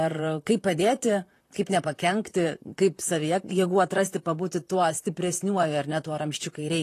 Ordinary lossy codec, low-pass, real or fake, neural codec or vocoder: AAC, 48 kbps; 14.4 kHz; fake; vocoder, 44.1 kHz, 128 mel bands, Pupu-Vocoder